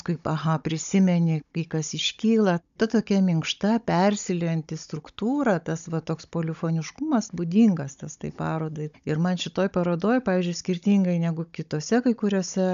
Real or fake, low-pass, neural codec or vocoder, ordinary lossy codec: fake; 7.2 kHz; codec, 16 kHz, 16 kbps, FunCodec, trained on Chinese and English, 50 frames a second; AAC, 96 kbps